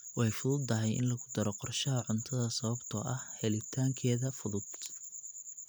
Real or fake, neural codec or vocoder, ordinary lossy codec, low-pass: real; none; none; none